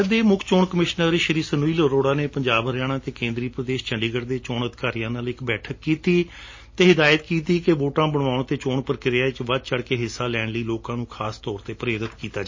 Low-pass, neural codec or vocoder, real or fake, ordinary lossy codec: 7.2 kHz; none; real; MP3, 32 kbps